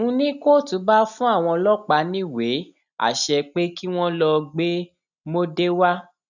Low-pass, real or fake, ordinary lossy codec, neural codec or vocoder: 7.2 kHz; real; none; none